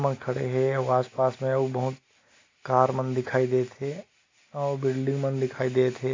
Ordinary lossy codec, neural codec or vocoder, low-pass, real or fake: AAC, 32 kbps; none; 7.2 kHz; real